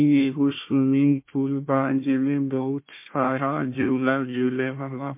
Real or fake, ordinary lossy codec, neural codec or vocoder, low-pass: fake; MP3, 24 kbps; codec, 16 kHz, 1 kbps, FunCodec, trained on Chinese and English, 50 frames a second; 3.6 kHz